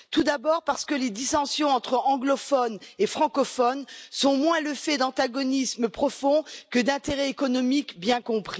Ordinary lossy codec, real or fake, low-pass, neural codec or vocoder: none; real; none; none